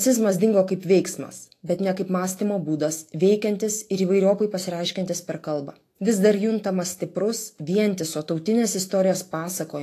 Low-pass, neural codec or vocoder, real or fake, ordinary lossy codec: 14.4 kHz; autoencoder, 48 kHz, 128 numbers a frame, DAC-VAE, trained on Japanese speech; fake; AAC, 48 kbps